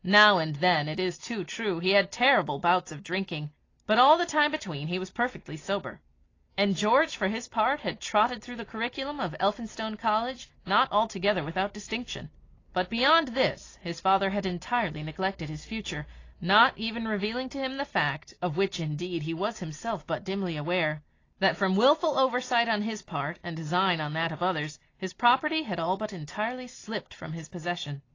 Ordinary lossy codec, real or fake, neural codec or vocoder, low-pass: AAC, 32 kbps; real; none; 7.2 kHz